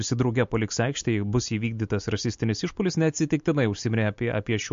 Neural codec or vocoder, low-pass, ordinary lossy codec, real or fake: none; 7.2 kHz; MP3, 48 kbps; real